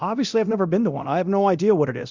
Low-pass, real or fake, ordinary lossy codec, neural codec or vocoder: 7.2 kHz; fake; Opus, 64 kbps; codec, 24 kHz, 0.9 kbps, DualCodec